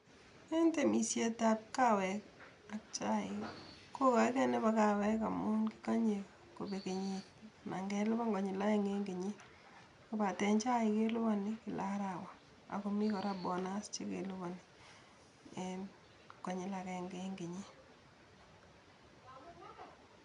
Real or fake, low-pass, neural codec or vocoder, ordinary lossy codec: real; 10.8 kHz; none; none